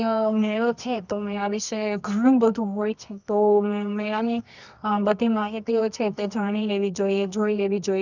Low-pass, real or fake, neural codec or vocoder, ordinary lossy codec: 7.2 kHz; fake; codec, 24 kHz, 0.9 kbps, WavTokenizer, medium music audio release; none